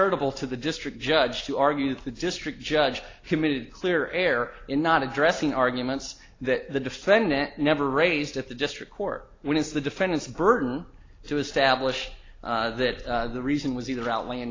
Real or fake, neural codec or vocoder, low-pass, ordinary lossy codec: real; none; 7.2 kHz; AAC, 32 kbps